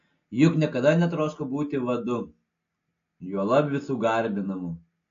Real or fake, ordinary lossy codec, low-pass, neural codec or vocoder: real; AAC, 48 kbps; 7.2 kHz; none